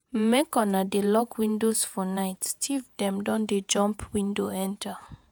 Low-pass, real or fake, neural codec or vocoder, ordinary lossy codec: none; fake; vocoder, 48 kHz, 128 mel bands, Vocos; none